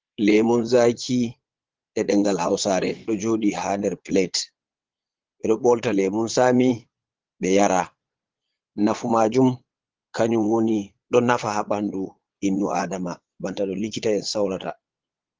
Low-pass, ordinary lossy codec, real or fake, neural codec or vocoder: 7.2 kHz; Opus, 16 kbps; fake; vocoder, 22.05 kHz, 80 mel bands, Vocos